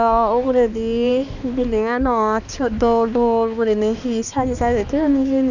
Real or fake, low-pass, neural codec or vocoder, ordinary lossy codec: fake; 7.2 kHz; codec, 16 kHz, 2 kbps, X-Codec, HuBERT features, trained on balanced general audio; none